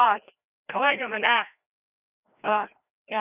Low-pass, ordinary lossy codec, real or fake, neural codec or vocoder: 3.6 kHz; none; fake; codec, 16 kHz, 1 kbps, FreqCodec, larger model